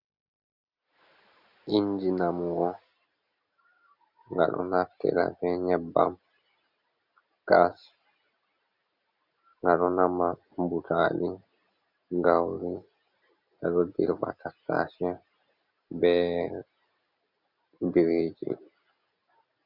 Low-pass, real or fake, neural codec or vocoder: 5.4 kHz; real; none